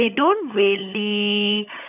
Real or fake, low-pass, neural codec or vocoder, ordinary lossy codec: fake; 3.6 kHz; codec, 16 kHz, 16 kbps, FunCodec, trained on LibriTTS, 50 frames a second; none